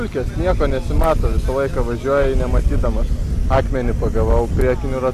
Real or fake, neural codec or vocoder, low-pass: real; none; 14.4 kHz